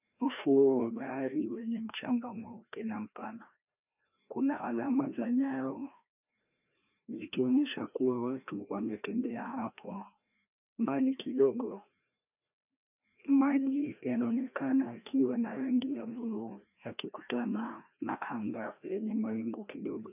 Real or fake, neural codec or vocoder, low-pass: fake; codec, 16 kHz, 1 kbps, FreqCodec, larger model; 3.6 kHz